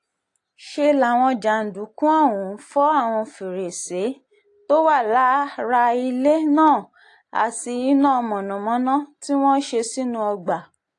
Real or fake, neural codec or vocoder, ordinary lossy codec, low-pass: real; none; AAC, 48 kbps; 10.8 kHz